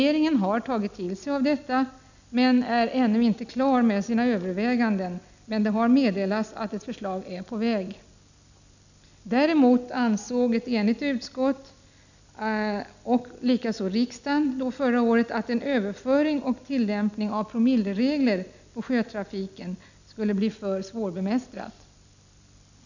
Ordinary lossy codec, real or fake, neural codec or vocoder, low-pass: none; real; none; 7.2 kHz